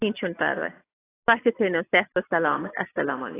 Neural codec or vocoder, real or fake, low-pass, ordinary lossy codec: none; real; 3.6 kHz; AAC, 16 kbps